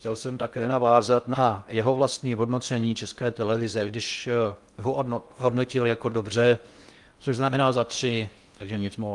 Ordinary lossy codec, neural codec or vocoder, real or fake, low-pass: Opus, 32 kbps; codec, 16 kHz in and 24 kHz out, 0.6 kbps, FocalCodec, streaming, 2048 codes; fake; 10.8 kHz